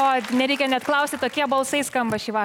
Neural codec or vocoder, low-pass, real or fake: none; 19.8 kHz; real